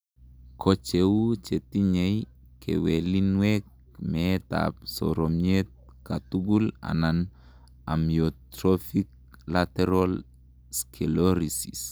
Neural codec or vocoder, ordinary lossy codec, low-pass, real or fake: none; none; none; real